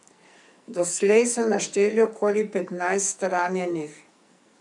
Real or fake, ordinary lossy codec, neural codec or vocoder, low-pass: fake; none; codec, 44.1 kHz, 2.6 kbps, SNAC; 10.8 kHz